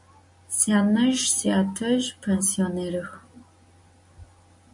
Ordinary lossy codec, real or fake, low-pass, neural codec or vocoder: MP3, 48 kbps; real; 10.8 kHz; none